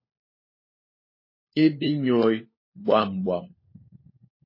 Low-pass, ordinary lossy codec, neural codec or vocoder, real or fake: 5.4 kHz; MP3, 24 kbps; codec, 16 kHz, 4 kbps, FunCodec, trained on LibriTTS, 50 frames a second; fake